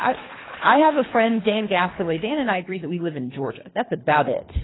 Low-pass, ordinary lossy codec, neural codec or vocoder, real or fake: 7.2 kHz; AAC, 16 kbps; codec, 24 kHz, 3 kbps, HILCodec; fake